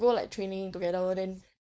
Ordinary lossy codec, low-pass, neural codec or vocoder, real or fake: none; none; codec, 16 kHz, 4.8 kbps, FACodec; fake